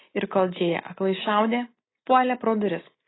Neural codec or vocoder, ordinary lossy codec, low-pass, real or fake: none; AAC, 16 kbps; 7.2 kHz; real